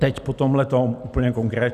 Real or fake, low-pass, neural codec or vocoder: real; 14.4 kHz; none